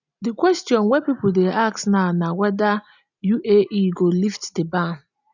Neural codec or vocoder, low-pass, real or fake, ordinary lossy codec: none; 7.2 kHz; real; none